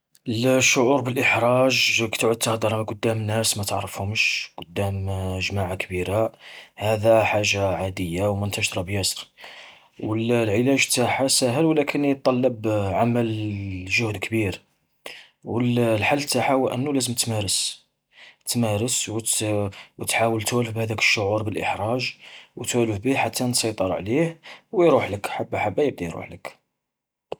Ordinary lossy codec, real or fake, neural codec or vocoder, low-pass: none; real; none; none